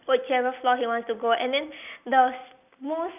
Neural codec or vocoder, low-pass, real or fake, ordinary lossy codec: none; 3.6 kHz; real; none